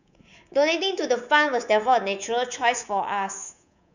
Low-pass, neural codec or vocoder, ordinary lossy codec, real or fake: 7.2 kHz; codec, 24 kHz, 3.1 kbps, DualCodec; none; fake